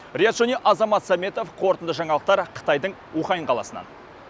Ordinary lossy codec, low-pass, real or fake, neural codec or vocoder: none; none; real; none